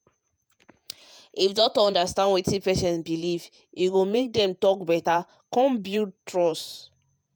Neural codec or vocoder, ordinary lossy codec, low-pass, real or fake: vocoder, 48 kHz, 128 mel bands, Vocos; none; none; fake